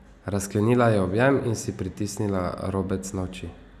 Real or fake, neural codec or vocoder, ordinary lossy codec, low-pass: real; none; none; 14.4 kHz